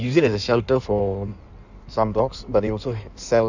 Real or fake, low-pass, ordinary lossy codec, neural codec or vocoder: fake; 7.2 kHz; none; codec, 16 kHz in and 24 kHz out, 1.1 kbps, FireRedTTS-2 codec